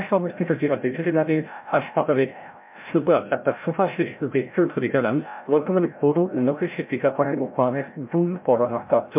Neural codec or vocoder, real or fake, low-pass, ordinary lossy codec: codec, 16 kHz, 0.5 kbps, FreqCodec, larger model; fake; 3.6 kHz; MP3, 32 kbps